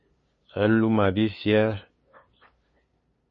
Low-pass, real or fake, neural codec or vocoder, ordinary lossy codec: 7.2 kHz; fake; codec, 16 kHz, 2 kbps, FunCodec, trained on LibriTTS, 25 frames a second; MP3, 32 kbps